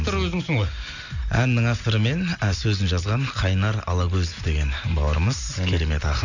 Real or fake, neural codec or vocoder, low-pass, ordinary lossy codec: real; none; 7.2 kHz; none